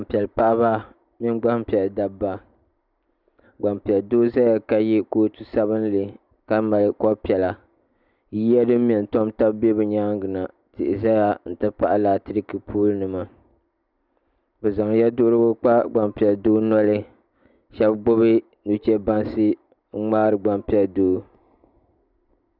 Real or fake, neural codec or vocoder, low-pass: real; none; 5.4 kHz